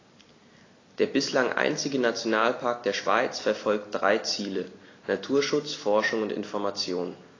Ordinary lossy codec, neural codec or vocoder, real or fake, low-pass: AAC, 32 kbps; none; real; 7.2 kHz